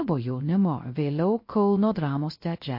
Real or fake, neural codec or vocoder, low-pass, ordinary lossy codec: fake; codec, 16 kHz, 0.3 kbps, FocalCodec; 5.4 kHz; MP3, 32 kbps